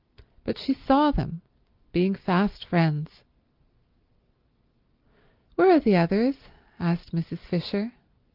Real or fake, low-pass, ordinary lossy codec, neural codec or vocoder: real; 5.4 kHz; Opus, 24 kbps; none